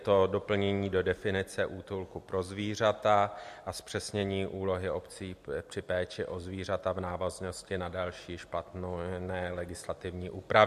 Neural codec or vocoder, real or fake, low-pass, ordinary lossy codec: none; real; 14.4 kHz; MP3, 64 kbps